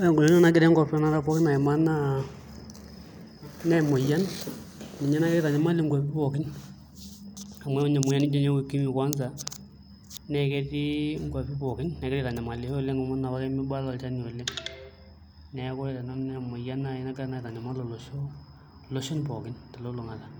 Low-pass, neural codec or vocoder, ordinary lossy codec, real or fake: none; none; none; real